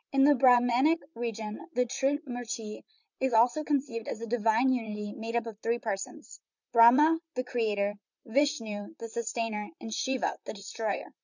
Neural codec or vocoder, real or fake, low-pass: vocoder, 44.1 kHz, 128 mel bands, Pupu-Vocoder; fake; 7.2 kHz